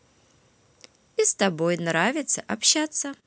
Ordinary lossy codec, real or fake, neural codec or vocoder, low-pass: none; real; none; none